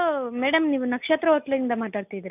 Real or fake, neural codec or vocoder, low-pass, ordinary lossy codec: real; none; 3.6 kHz; none